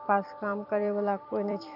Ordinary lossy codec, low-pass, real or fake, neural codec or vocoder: none; 5.4 kHz; fake; vocoder, 44.1 kHz, 128 mel bands every 256 samples, BigVGAN v2